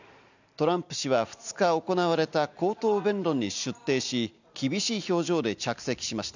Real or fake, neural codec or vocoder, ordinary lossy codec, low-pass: real; none; none; 7.2 kHz